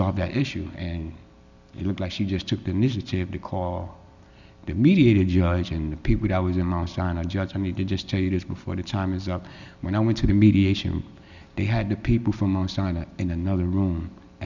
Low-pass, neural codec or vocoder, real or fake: 7.2 kHz; none; real